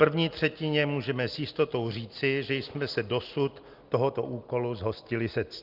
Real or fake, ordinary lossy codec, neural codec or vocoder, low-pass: real; Opus, 32 kbps; none; 5.4 kHz